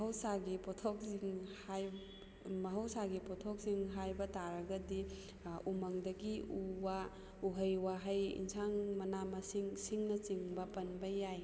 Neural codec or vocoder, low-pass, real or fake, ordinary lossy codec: none; none; real; none